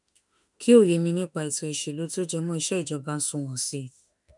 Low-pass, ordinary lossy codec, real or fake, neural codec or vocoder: 10.8 kHz; none; fake; autoencoder, 48 kHz, 32 numbers a frame, DAC-VAE, trained on Japanese speech